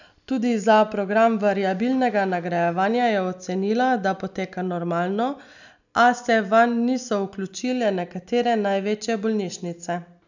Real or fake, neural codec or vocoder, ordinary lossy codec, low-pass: real; none; none; 7.2 kHz